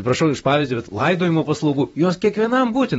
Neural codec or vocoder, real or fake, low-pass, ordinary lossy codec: vocoder, 44.1 kHz, 128 mel bands, Pupu-Vocoder; fake; 19.8 kHz; AAC, 24 kbps